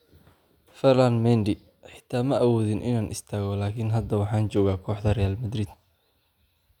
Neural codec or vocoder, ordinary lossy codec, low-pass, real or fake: none; none; 19.8 kHz; real